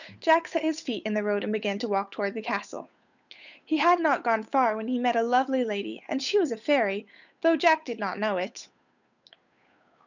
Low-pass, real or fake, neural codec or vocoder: 7.2 kHz; fake; codec, 16 kHz, 8 kbps, FunCodec, trained on Chinese and English, 25 frames a second